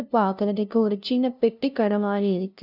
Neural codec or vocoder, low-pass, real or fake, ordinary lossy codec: codec, 16 kHz, 0.5 kbps, FunCodec, trained on LibriTTS, 25 frames a second; 5.4 kHz; fake; none